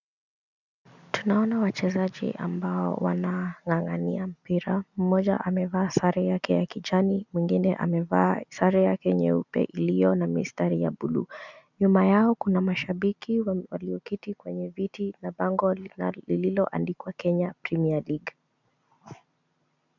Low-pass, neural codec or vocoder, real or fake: 7.2 kHz; none; real